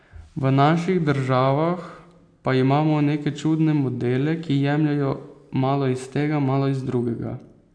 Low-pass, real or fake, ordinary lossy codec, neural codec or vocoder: 9.9 kHz; real; AAC, 64 kbps; none